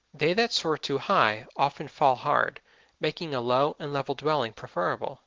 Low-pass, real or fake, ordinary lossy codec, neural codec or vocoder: 7.2 kHz; real; Opus, 16 kbps; none